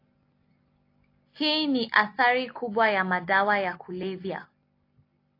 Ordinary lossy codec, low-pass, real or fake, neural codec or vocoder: AAC, 32 kbps; 5.4 kHz; real; none